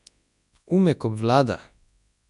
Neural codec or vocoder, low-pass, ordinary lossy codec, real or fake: codec, 24 kHz, 0.9 kbps, WavTokenizer, large speech release; 10.8 kHz; none; fake